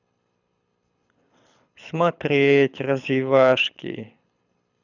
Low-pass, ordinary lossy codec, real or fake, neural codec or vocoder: 7.2 kHz; none; fake; codec, 24 kHz, 6 kbps, HILCodec